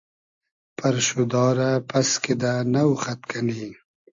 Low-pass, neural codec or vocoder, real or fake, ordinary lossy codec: 7.2 kHz; none; real; AAC, 64 kbps